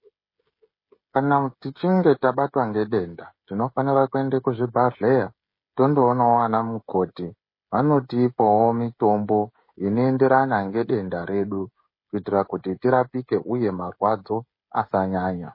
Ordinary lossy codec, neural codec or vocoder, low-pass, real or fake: MP3, 24 kbps; codec, 16 kHz, 16 kbps, FreqCodec, smaller model; 5.4 kHz; fake